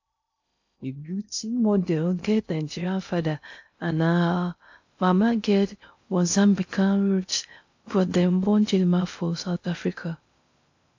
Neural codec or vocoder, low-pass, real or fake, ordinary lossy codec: codec, 16 kHz in and 24 kHz out, 0.6 kbps, FocalCodec, streaming, 2048 codes; 7.2 kHz; fake; AAC, 48 kbps